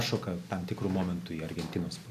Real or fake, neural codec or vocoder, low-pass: real; none; 14.4 kHz